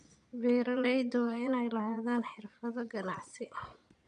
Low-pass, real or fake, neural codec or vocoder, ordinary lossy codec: 9.9 kHz; fake; vocoder, 22.05 kHz, 80 mel bands, Vocos; none